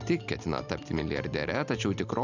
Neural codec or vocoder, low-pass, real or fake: none; 7.2 kHz; real